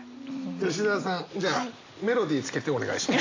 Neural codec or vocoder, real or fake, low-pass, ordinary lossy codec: none; real; 7.2 kHz; AAC, 32 kbps